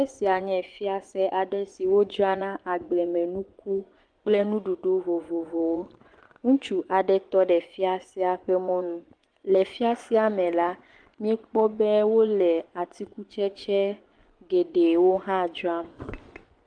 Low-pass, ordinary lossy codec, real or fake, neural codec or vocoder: 9.9 kHz; Opus, 24 kbps; fake; vocoder, 24 kHz, 100 mel bands, Vocos